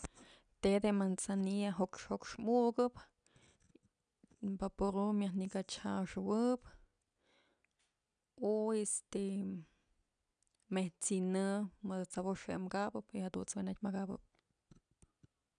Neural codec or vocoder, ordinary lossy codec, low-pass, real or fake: none; none; 9.9 kHz; real